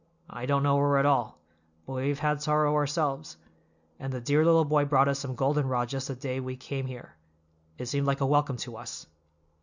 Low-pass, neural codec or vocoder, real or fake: 7.2 kHz; none; real